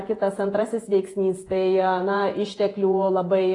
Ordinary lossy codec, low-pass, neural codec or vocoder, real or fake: AAC, 32 kbps; 10.8 kHz; vocoder, 48 kHz, 128 mel bands, Vocos; fake